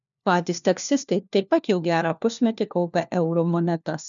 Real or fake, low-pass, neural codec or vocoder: fake; 7.2 kHz; codec, 16 kHz, 1 kbps, FunCodec, trained on LibriTTS, 50 frames a second